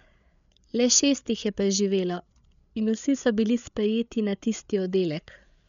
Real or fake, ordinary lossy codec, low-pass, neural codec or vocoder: fake; none; 7.2 kHz; codec, 16 kHz, 8 kbps, FreqCodec, larger model